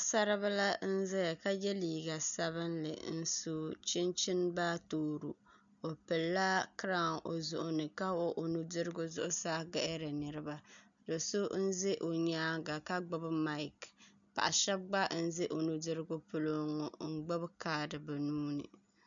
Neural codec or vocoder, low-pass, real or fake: none; 7.2 kHz; real